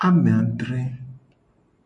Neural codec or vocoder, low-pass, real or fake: none; 10.8 kHz; real